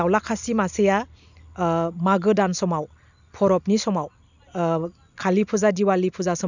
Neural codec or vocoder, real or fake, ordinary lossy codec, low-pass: none; real; none; 7.2 kHz